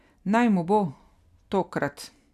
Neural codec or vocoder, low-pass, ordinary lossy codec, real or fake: none; 14.4 kHz; none; real